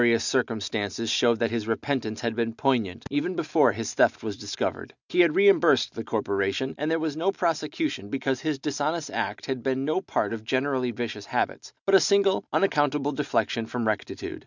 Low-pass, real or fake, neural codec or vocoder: 7.2 kHz; real; none